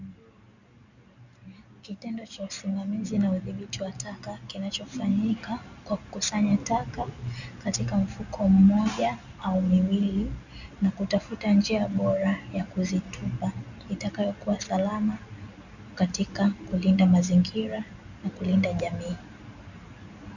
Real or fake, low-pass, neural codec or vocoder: real; 7.2 kHz; none